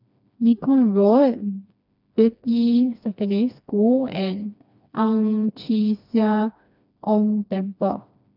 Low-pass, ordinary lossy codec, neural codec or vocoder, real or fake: 5.4 kHz; none; codec, 16 kHz, 2 kbps, FreqCodec, smaller model; fake